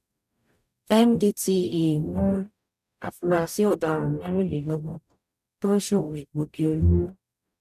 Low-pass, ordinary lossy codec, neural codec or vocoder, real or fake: 14.4 kHz; none; codec, 44.1 kHz, 0.9 kbps, DAC; fake